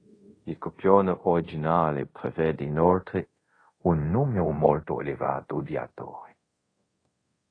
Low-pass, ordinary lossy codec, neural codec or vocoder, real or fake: 9.9 kHz; AAC, 32 kbps; codec, 24 kHz, 0.5 kbps, DualCodec; fake